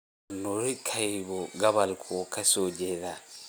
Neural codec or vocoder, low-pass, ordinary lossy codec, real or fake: none; none; none; real